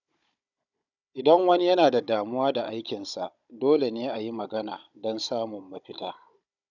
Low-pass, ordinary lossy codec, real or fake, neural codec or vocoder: 7.2 kHz; none; fake; codec, 16 kHz, 16 kbps, FunCodec, trained on Chinese and English, 50 frames a second